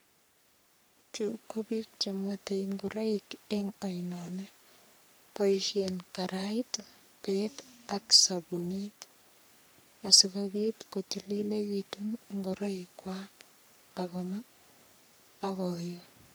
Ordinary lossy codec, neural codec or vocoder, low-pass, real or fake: none; codec, 44.1 kHz, 3.4 kbps, Pupu-Codec; none; fake